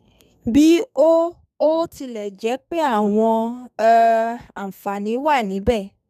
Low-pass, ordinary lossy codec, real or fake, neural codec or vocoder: 14.4 kHz; none; fake; codec, 32 kHz, 1.9 kbps, SNAC